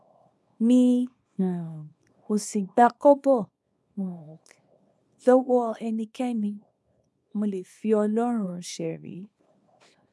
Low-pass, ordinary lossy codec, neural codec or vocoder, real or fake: none; none; codec, 24 kHz, 0.9 kbps, WavTokenizer, small release; fake